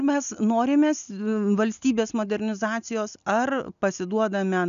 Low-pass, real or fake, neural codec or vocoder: 7.2 kHz; real; none